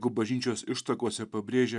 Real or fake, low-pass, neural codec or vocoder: real; 10.8 kHz; none